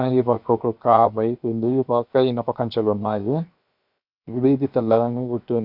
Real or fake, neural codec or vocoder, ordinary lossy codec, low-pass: fake; codec, 16 kHz, 0.7 kbps, FocalCodec; none; 5.4 kHz